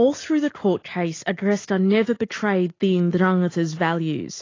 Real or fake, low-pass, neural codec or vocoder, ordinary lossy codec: real; 7.2 kHz; none; AAC, 32 kbps